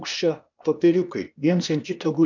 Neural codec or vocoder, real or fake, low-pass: codec, 16 kHz, 0.8 kbps, ZipCodec; fake; 7.2 kHz